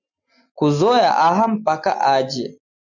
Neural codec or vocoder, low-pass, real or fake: none; 7.2 kHz; real